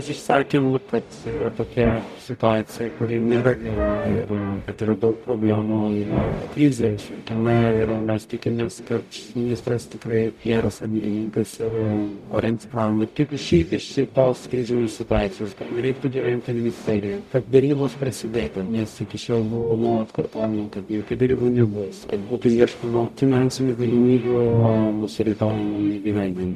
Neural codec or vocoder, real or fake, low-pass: codec, 44.1 kHz, 0.9 kbps, DAC; fake; 14.4 kHz